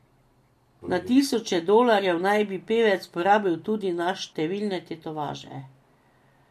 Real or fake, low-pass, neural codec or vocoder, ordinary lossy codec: real; 14.4 kHz; none; MP3, 64 kbps